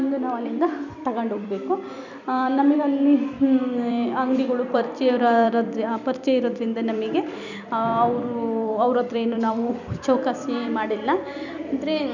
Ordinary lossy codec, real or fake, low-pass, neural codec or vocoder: none; fake; 7.2 kHz; vocoder, 44.1 kHz, 128 mel bands every 256 samples, BigVGAN v2